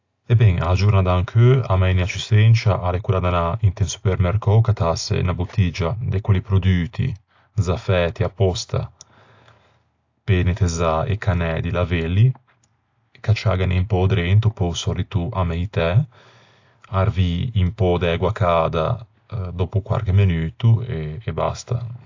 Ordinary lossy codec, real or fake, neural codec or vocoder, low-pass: AAC, 48 kbps; real; none; 7.2 kHz